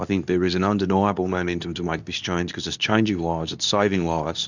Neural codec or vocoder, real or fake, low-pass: codec, 24 kHz, 0.9 kbps, WavTokenizer, medium speech release version 2; fake; 7.2 kHz